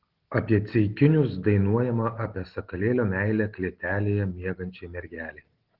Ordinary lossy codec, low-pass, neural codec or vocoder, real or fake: Opus, 16 kbps; 5.4 kHz; none; real